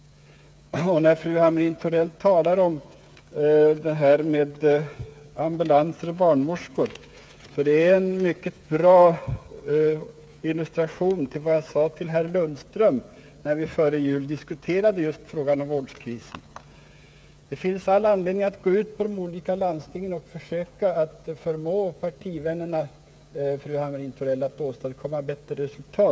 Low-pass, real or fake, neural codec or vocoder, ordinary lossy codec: none; fake; codec, 16 kHz, 8 kbps, FreqCodec, smaller model; none